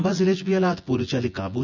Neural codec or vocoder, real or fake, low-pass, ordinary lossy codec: vocoder, 24 kHz, 100 mel bands, Vocos; fake; 7.2 kHz; none